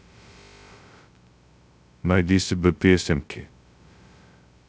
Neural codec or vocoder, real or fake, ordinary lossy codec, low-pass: codec, 16 kHz, 0.2 kbps, FocalCodec; fake; none; none